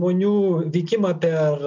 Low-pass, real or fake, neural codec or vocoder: 7.2 kHz; real; none